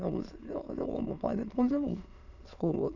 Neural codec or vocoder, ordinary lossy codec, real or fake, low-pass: autoencoder, 22.05 kHz, a latent of 192 numbers a frame, VITS, trained on many speakers; none; fake; 7.2 kHz